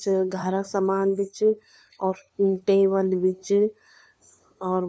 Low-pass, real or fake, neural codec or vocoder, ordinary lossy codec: none; fake; codec, 16 kHz, 2 kbps, FunCodec, trained on LibriTTS, 25 frames a second; none